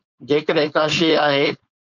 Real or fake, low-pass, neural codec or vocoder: fake; 7.2 kHz; codec, 16 kHz, 4.8 kbps, FACodec